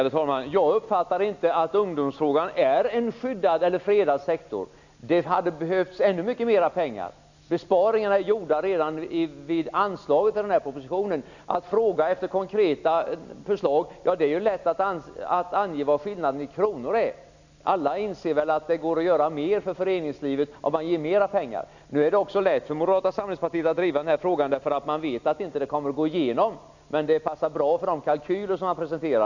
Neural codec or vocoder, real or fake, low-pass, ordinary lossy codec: none; real; 7.2 kHz; none